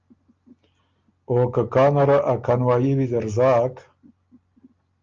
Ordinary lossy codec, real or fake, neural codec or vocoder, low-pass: Opus, 24 kbps; real; none; 7.2 kHz